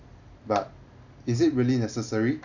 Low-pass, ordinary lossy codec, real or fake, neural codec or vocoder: 7.2 kHz; none; real; none